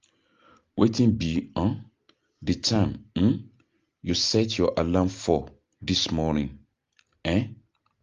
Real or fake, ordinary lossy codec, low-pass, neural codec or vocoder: real; Opus, 32 kbps; 7.2 kHz; none